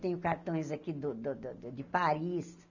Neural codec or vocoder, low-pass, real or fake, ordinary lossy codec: none; 7.2 kHz; real; none